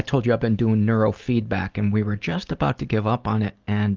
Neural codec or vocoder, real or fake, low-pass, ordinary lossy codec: none; real; 7.2 kHz; Opus, 32 kbps